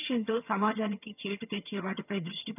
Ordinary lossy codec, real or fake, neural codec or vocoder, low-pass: none; fake; vocoder, 22.05 kHz, 80 mel bands, HiFi-GAN; 3.6 kHz